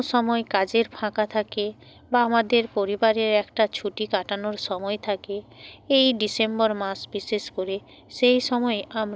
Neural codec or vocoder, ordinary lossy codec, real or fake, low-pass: none; none; real; none